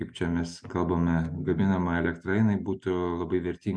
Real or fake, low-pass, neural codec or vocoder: real; 9.9 kHz; none